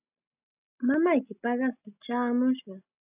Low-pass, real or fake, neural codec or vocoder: 3.6 kHz; real; none